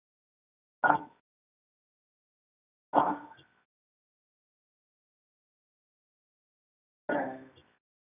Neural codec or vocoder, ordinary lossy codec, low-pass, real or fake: codec, 24 kHz, 0.9 kbps, WavTokenizer, medium music audio release; none; 3.6 kHz; fake